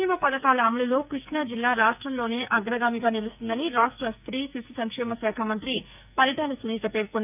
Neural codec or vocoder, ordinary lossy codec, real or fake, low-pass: codec, 44.1 kHz, 2.6 kbps, SNAC; none; fake; 3.6 kHz